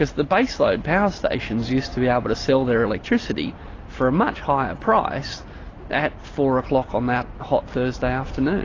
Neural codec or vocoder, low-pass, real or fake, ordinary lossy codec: none; 7.2 kHz; real; AAC, 32 kbps